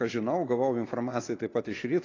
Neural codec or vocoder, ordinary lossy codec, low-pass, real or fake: none; AAC, 32 kbps; 7.2 kHz; real